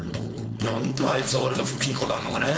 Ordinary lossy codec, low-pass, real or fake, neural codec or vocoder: none; none; fake; codec, 16 kHz, 4.8 kbps, FACodec